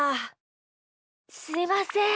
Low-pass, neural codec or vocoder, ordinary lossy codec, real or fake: none; none; none; real